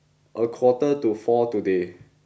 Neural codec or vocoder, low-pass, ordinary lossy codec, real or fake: none; none; none; real